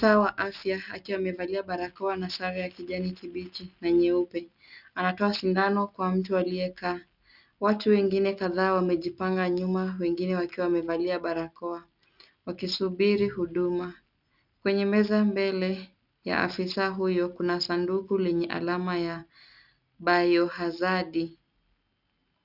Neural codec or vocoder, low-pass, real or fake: none; 5.4 kHz; real